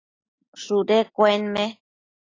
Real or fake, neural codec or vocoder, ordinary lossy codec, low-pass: real; none; AAC, 32 kbps; 7.2 kHz